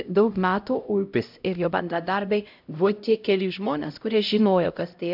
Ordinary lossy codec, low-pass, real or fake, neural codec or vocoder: AAC, 48 kbps; 5.4 kHz; fake; codec, 16 kHz, 0.5 kbps, X-Codec, HuBERT features, trained on LibriSpeech